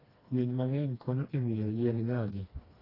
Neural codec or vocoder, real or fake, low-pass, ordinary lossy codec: codec, 16 kHz, 2 kbps, FreqCodec, smaller model; fake; 5.4 kHz; AAC, 24 kbps